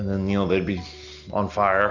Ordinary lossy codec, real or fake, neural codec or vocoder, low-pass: Opus, 64 kbps; real; none; 7.2 kHz